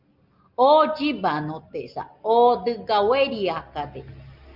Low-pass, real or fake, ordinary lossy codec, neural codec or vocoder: 5.4 kHz; real; Opus, 24 kbps; none